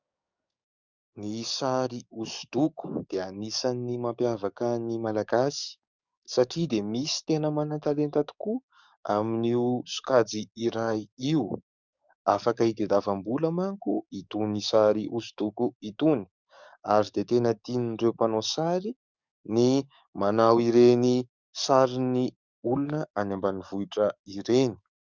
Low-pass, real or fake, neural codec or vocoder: 7.2 kHz; fake; codec, 44.1 kHz, 7.8 kbps, DAC